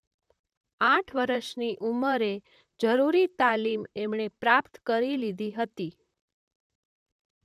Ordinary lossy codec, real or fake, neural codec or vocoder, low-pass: none; fake; vocoder, 44.1 kHz, 128 mel bands, Pupu-Vocoder; 14.4 kHz